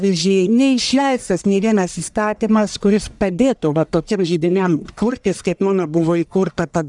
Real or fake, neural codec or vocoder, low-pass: fake; codec, 44.1 kHz, 1.7 kbps, Pupu-Codec; 10.8 kHz